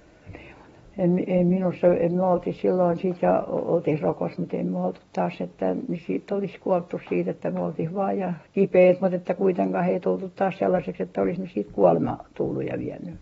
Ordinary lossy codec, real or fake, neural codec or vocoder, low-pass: AAC, 24 kbps; real; none; 19.8 kHz